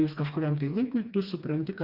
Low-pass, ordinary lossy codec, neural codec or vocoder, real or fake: 5.4 kHz; Opus, 64 kbps; codec, 16 kHz, 2 kbps, FreqCodec, smaller model; fake